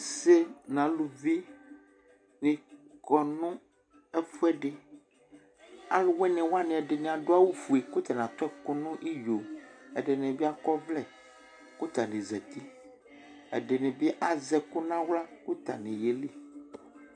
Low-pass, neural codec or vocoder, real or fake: 9.9 kHz; none; real